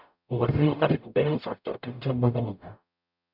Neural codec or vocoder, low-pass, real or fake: codec, 44.1 kHz, 0.9 kbps, DAC; 5.4 kHz; fake